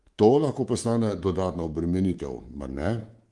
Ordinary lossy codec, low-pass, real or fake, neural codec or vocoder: Opus, 24 kbps; 10.8 kHz; fake; codec, 24 kHz, 3.1 kbps, DualCodec